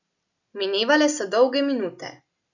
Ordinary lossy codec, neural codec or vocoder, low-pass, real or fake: none; none; 7.2 kHz; real